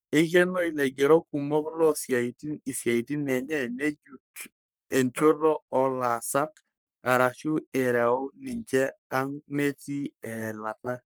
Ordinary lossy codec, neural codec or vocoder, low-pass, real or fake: none; codec, 44.1 kHz, 3.4 kbps, Pupu-Codec; none; fake